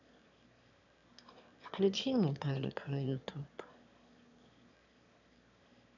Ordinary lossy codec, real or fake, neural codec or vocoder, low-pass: none; fake; autoencoder, 22.05 kHz, a latent of 192 numbers a frame, VITS, trained on one speaker; 7.2 kHz